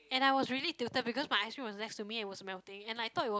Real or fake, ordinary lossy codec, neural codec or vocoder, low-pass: real; none; none; none